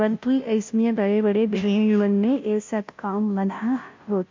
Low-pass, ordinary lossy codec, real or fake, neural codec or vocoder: 7.2 kHz; MP3, 48 kbps; fake; codec, 16 kHz, 0.5 kbps, FunCodec, trained on Chinese and English, 25 frames a second